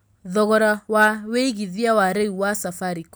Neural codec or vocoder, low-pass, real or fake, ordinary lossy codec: none; none; real; none